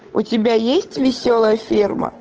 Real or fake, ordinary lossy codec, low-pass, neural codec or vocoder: fake; Opus, 16 kbps; 7.2 kHz; codec, 16 kHz, 8 kbps, FunCodec, trained on Chinese and English, 25 frames a second